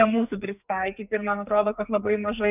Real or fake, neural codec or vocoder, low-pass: fake; codec, 44.1 kHz, 2.6 kbps, SNAC; 3.6 kHz